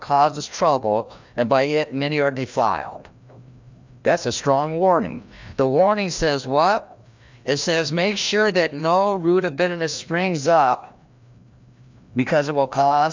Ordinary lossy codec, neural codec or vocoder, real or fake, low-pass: MP3, 64 kbps; codec, 16 kHz, 1 kbps, FreqCodec, larger model; fake; 7.2 kHz